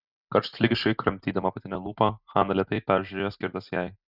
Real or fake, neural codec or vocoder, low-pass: real; none; 5.4 kHz